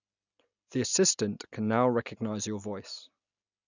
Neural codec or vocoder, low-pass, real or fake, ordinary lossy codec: none; 7.2 kHz; real; none